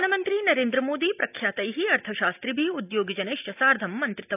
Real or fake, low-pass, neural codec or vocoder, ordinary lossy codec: real; 3.6 kHz; none; none